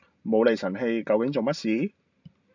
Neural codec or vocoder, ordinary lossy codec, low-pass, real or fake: none; MP3, 64 kbps; 7.2 kHz; real